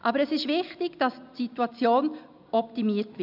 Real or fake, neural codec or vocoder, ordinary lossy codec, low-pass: real; none; none; 5.4 kHz